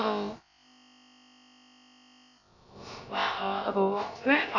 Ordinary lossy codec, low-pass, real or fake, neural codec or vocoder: none; 7.2 kHz; fake; codec, 16 kHz, about 1 kbps, DyCAST, with the encoder's durations